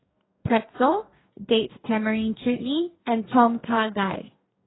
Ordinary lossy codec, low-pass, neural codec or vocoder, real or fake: AAC, 16 kbps; 7.2 kHz; codec, 44.1 kHz, 2.6 kbps, DAC; fake